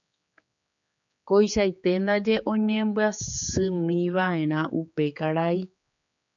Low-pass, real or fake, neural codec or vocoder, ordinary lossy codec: 7.2 kHz; fake; codec, 16 kHz, 4 kbps, X-Codec, HuBERT features, trained on general audio; MP3, 96 kbps